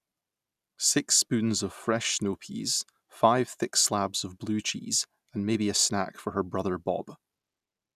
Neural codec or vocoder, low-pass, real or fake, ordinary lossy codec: none; 14.4 kHz; real; none